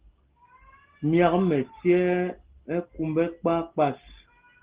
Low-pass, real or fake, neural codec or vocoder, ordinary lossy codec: 3.6 kHz; real; none; Opus, 16 kbps